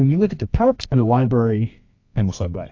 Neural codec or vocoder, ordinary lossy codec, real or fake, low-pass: codec, 24 kHz, 0.9 kbps, WavTokenizer, medium music audio release; AAC, 48 kbps; fake; 7.2 kHz